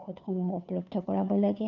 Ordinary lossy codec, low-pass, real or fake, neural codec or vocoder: none; 7.2 kHz; fake; codec, 16 kHz, 2 kbps, FunCodec, trained on Chinese and English, 25 frames a second